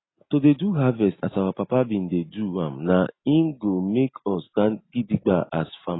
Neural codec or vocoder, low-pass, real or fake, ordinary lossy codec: none; 7.2 kHz; real; AAC, 16 kbps